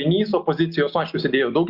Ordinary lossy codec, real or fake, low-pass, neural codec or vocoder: Opus, 64 kbps; real; 5.4 kHz; none